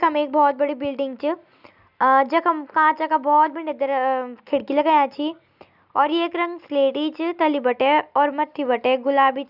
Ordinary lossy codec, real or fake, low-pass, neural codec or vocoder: none; real; 5.4 kHz; none